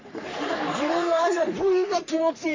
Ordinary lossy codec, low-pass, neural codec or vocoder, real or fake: MP3, 48 kbps; 7.2 kHz; codec, 44.1 kHz, 3.4 kbps, Pupu-Codec; fake